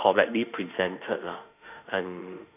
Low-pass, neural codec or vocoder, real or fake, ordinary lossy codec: 3.6 kHz; autoencoder, 48 kHz, 32 numbers a frame, DAC-VAE, trained on Japanese speech; fake; none